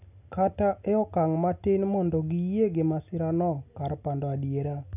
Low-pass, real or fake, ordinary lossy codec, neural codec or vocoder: 3.6 kHz; real; none; none